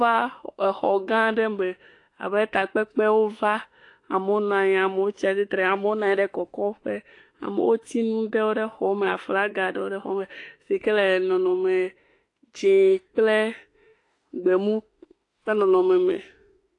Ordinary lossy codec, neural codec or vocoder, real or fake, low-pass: AAC, 48 kbps; autoencoder, 48 kHz, 32 numbers a frame, DAC-VAE, trained on Japanese speech; fake; 10.8 kHz